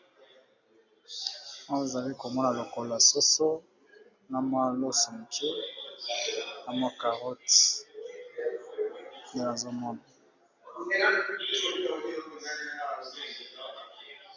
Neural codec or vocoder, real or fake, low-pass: none; real; 7.2 kHz